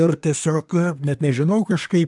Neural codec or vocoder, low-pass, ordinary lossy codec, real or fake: codec, 24 kHz, 1 kbps, SNAC; 10.8 kHz; MP3, 96 kbps; fake